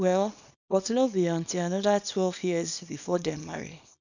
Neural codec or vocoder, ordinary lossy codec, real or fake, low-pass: codec, 24 kHz, 0.9 kbps, WavTokenizer, small release; none; fake; 7.2 kHz